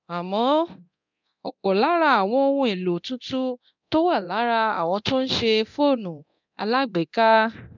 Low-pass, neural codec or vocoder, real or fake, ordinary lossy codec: 7.2 kHz; codec, 24 kHz, 0.9 kbps, DualCodec; fake; none